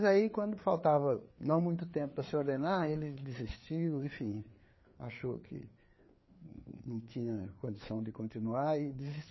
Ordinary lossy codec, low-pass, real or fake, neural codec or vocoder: MP3, 24 kbps; 7.2 kHz; fake; codec, 16 kHz, 4 kbps, FreqCodec, larger model